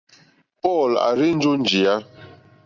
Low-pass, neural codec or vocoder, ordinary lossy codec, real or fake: 7.2 kHz; none; Opus, 64 kbps; real